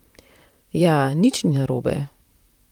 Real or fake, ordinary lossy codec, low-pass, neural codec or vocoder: fake; Opus, 32 kbps; 19.8 kHz; vocoder, 44.1 kHz, 128 mel bands, Pupu-Vocoder